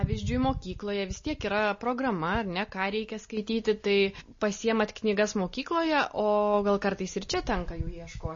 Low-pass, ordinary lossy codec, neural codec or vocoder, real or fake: 7.2 kHz; MP3, 32 kbps; none; real